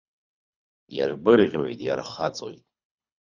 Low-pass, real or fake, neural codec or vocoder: 7.2 kHz; fake; codec, 24 kHz, 3 kbps, HILCodec